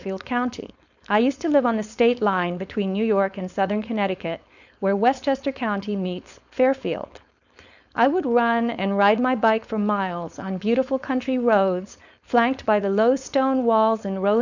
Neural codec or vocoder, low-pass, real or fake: codec, 16 kHz, 4.8 kbps, FACodec; 7.2 kHz; fake